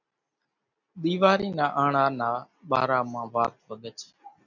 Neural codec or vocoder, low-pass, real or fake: none; 7.2 kHz; real